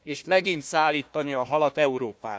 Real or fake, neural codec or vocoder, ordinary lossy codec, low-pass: fake; codec, 16 kHz, 1 kbps, FunCodec, trained on Chinese and English, 50 frames a second; none; none